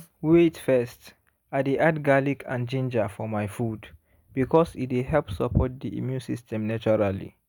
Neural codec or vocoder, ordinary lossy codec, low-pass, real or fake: none; none; none; real